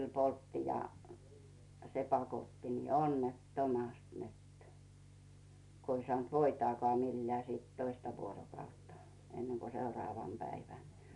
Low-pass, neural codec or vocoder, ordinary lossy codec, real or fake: 10.8 kHz; none; none; real